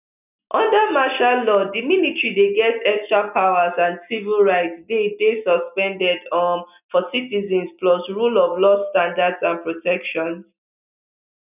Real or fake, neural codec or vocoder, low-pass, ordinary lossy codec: real; none; 3.6 kHz; none